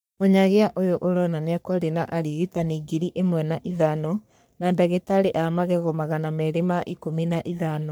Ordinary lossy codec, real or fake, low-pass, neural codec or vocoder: none; fake; none; codec, 44.1 kHz, 3.4 kbps, Pupu-Codec